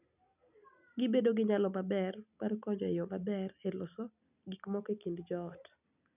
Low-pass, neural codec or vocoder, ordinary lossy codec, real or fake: 3.6 kHz; none; none; real